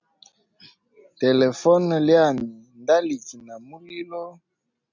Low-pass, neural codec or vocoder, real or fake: 7.2 kHz; none; real